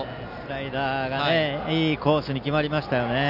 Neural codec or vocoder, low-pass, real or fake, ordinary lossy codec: none; 5.4 kHz; real; none